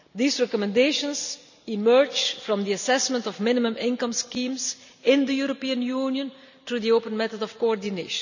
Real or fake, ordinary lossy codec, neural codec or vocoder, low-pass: real; none; none; 7.2 kHz